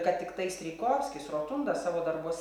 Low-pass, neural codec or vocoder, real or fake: 19.8 kHz; none; real